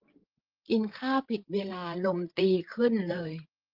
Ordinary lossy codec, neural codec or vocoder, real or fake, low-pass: Opus, 32 kbps; codec, 16 kHz, 8 kbps, FreqCodec, larger model; fake; 5.4 kHz